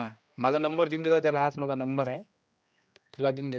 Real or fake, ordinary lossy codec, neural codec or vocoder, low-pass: fake; none; codec, 16 kHz, 1 kbps, X-Codec, HuBERT features, trained on general audio; none